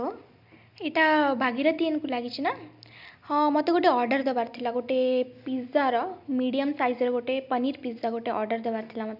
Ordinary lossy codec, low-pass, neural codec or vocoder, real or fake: none; 5.4 kHz; none; real